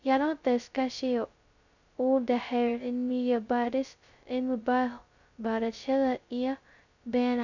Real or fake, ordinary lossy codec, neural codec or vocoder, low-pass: fake; none; codec, 16 kHz, 0.2 kbps, FocalCodec; 7.2 kHz